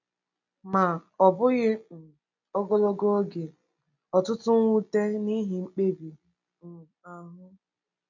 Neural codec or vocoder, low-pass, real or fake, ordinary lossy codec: none; 7.2 kHz; real; none